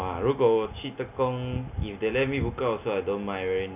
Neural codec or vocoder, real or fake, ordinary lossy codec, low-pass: none; real; none; 3.6 kHz